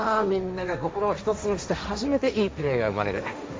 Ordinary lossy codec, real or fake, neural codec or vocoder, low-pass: none; fake; codec, 16 kHz, 1.1 kbps, Voila-Tokenizer; none